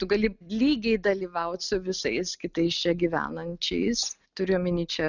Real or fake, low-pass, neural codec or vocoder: real; 7.2 kHz; none